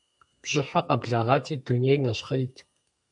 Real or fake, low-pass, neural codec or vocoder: fake; 10.8 kHz; codec, 32 kHz, 1.9 kbps, SNAC